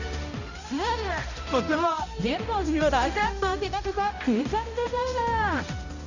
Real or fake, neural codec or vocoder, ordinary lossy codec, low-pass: fake; codec, 16 kHz, 0.5 kbps, X-Codec, HuBERT features, trained on balanced general audio; none; 7.2 kHz